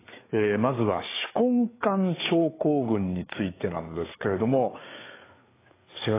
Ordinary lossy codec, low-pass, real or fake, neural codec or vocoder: AAC, 16 kbps; 3.6 kHz; fake; codec, 16 kHz, 4 kbps, FreqCodec, larger model